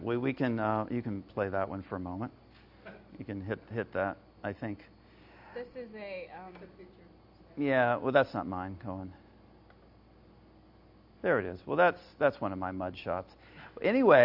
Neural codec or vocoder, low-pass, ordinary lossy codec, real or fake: none; 5.4 kHz; AAC, 48 kbps; real